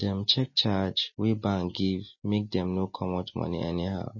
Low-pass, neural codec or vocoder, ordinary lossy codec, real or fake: 7.2 kHz; none; MP3, 32 kbps; real